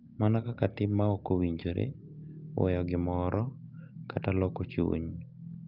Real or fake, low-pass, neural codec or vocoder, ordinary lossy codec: real; 5.4 kHz; none; Opus, 24 kbps